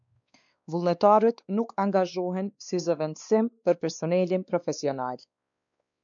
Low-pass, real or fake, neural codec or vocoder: 7.2 kHz; fake; codec, 16 kHz, 2 kbps, X-Codec, WavLM features, trained on Multilingual LibriSpeech